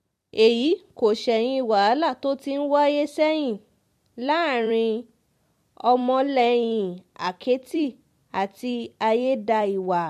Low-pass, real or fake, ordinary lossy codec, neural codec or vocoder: 14.4 kHz; fake; MP3, 64 kbps; vocoder, 44.1 kHz, 128 mel bands every 256 samples, BigVGAN v2